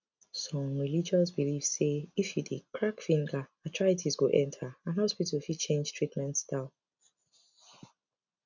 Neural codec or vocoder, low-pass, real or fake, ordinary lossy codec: none; 7.2 kHz; real; none